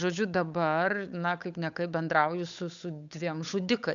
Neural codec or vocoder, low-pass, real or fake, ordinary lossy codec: codec, 16 kHz, 8 kbps, FunCodec, trained on Chinese and English, 25 frames a second; 7.2 kHz; fake; MP3, 96 kbps